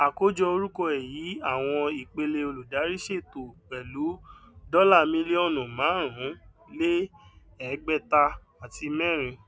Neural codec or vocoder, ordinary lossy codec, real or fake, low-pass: none; none; real; none